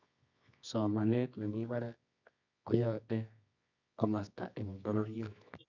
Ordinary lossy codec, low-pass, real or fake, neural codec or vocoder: none; 7.2 kHz; fake; codec, 24 kHz, 0.9 kbps, WavTokenizer, medium music audio release